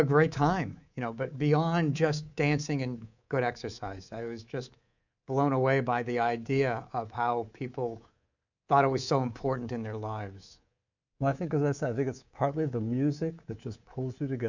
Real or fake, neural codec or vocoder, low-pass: fake; codec, 24 kHz, 3.1 kbps, DualCodec; 7.2 kHz